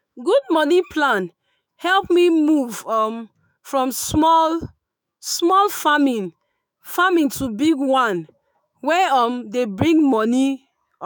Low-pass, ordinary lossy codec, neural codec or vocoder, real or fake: none; none; autoencoder, 48 kHz, 128 numbers a frame, DAC-VAE, trained on Japanese speech; fake